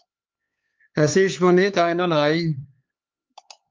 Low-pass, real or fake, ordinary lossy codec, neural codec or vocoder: 7.2 kHz; fake; Opus, 32 kbps; codec, 16 kHz, 4 kbps, X-Codec, HuBERT features, trained on LibriSpeech